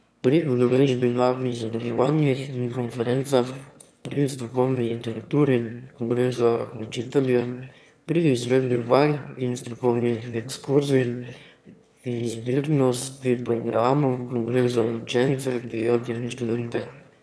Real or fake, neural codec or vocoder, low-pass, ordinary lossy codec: fake; autoencoder, 22.05 kHz, a latent of 192 numbers a frame, VITS, trained on one speaker; none; none